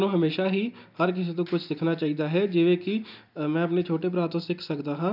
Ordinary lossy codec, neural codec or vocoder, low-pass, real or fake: none; none; 5.4 kHz; real